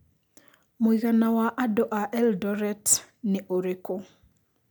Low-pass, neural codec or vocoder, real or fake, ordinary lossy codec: none; none; real; none